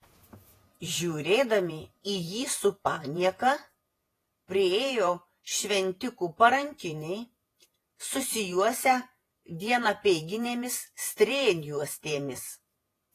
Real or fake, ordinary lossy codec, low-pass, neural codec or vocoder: fake; AAC, 48 kbps; 14.4 kHz; vocoder, 48 kHz, 128 mel bands, Vocos